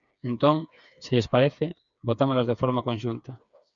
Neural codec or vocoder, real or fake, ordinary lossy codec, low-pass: codec, 16 kHz, 4 kbps, FreqCodec, smaller model; fake; MP3, 96 kbps; 7.2 kHz